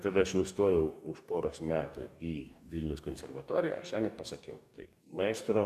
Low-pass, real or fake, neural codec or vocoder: 14.4 kHz; fake; codec, 44.1 kHz, 2.6 kbps, DAC